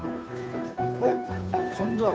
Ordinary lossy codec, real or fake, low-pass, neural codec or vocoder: none; fake; none; codec, 16 kHz, 2 kbps, FunCodec, trained on Chinese and English, 25 frames a second